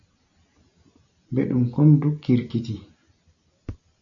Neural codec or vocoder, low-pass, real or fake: none; 7.2 kHz; real